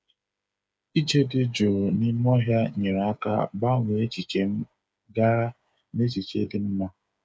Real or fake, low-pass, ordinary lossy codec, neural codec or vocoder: fake; none; none; codec, 16 kHz, 8 kbps, FreqCodec, smaller model